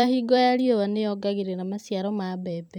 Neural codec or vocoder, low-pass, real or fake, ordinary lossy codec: vocoder, 44.1 kHz, 128 mel bands every 256 samples, BigVGAN v2; 19.8 kHz; fake; none